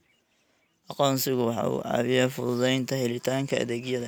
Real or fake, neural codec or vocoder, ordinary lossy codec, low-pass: real; none; none; none